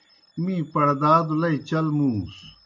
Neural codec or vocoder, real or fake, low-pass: none; real; 7.2 kHz